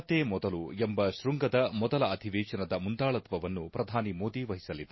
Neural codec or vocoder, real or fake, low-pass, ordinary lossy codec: none; real; 7.2 kHz; MP3, 24 kbps